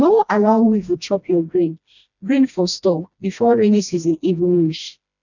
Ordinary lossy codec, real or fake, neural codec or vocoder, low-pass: none; fake; codec, 16 kHz, 1 kbps, FreqCodec, smaller model; 7.2 kHz